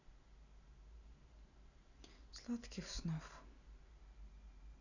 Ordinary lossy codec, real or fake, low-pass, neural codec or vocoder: none; real; 7.2 kHz; none